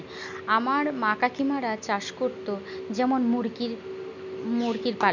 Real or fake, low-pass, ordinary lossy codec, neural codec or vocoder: real; 7.2 kHz; none; none